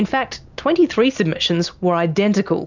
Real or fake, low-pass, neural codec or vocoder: real; 7.2 kHz; none